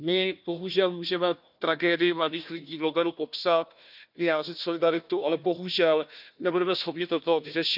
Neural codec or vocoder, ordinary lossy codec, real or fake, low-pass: codec, 16 kHz, 1 kbps, FunCodec, trained on Chinese and English, 50 frames a second; none; fake; 5.4 kHz